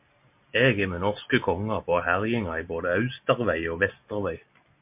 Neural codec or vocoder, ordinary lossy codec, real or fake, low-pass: none; MP3, 32 kbps; real; 3.6 kHz